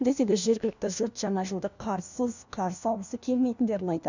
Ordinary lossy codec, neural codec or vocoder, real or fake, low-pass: none; codec, 16 kHz, 1 kbps, FunCodec, trained on LibriTTS, 50 frames a second; fake; 7.2 kHz